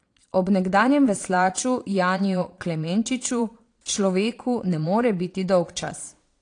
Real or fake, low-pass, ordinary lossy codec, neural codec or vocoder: fake; 9.9 kHz; AAC, 48 kbps; vocoder, 22.05 kHz, 80 mel bands, Vocos